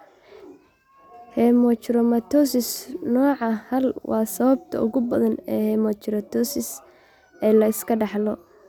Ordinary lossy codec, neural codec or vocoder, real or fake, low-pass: none; none; real; 19.8 kHz